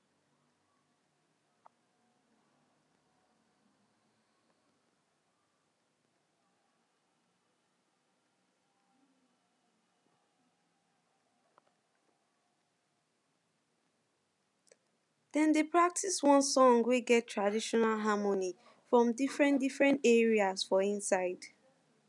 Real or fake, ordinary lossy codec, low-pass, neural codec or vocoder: real; none; 10.8 kHz; none